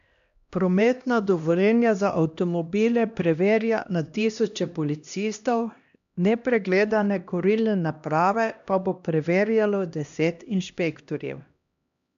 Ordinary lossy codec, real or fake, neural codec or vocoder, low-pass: AAC, 96 kbps; fake; codec, 16 kHz, 1 kbps, X-Codec, HuBERT features, trained on LibriSpeech; 7.2 kHz